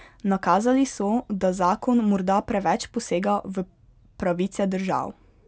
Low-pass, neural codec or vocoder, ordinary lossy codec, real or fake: none; none; none; real